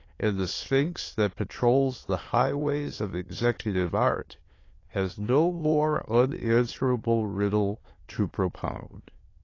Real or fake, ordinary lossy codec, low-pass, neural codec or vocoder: fake; AAC, 32 kbps; 7.2 kHz; autoencoder, 22.05 kHz, a latent of 192 numbers a frame, VITS, trained on many speakers